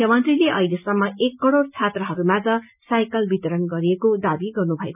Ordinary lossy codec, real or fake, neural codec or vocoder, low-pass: none; real; none; 3.6 kHz